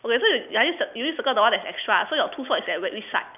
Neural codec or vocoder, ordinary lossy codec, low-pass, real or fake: none; none; 3.6 kHz; real